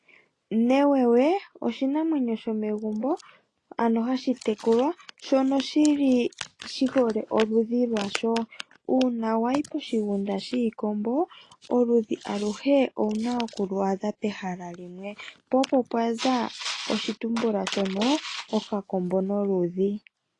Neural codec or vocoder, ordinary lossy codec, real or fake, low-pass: none; AAC, 32 kbps; real; 10.8 kHz